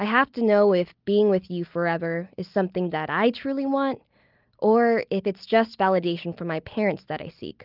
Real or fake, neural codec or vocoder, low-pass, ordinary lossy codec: real; none; 5.4 kHz; Opus, 32 kbps